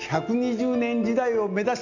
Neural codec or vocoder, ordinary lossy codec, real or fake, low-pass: none; none; real; 7.2 kHz